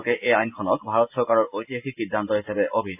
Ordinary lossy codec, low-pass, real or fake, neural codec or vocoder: AAC, 32 kbps; 3.6 kHz; real; none